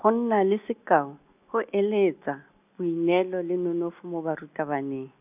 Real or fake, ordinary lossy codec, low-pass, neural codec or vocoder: real; none; 3.6 kHz; none